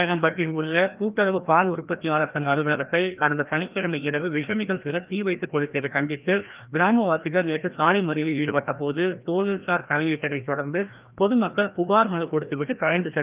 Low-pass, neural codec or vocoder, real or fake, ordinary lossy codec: 3.6 kHz; codec, 16 kHz, 1 kbps, FreqCodec, larger model; fake; Opus, 32 kbps